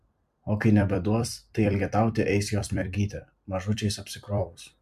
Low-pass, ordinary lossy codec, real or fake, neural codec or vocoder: 14.4 kHz; MP3, 96 kbps; fake; vocoder, 44.1 kHz, 128 mel bands every 256 samples, BigVGAN v2